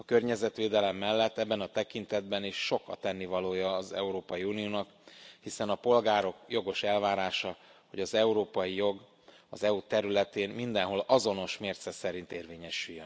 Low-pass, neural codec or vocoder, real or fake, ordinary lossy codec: none; none; real; none